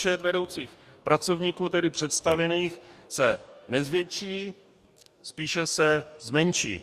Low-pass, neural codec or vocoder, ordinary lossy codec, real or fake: 14.4 kHz; codec, 44.1 kHz, 2.6 kbps, DAC; Opus, 64 kbps; fake